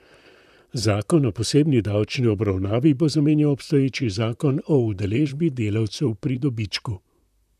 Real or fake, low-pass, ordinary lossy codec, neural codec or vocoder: fake; 14.4 kHz; none; vocoder, 44.1 kHz, 128 mel bands, Pupu-Vocoder